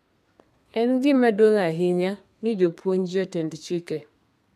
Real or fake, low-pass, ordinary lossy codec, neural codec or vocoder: fake; 14.4 kHz; none; codec, 32 kHz, 1.9 kbps, SNAC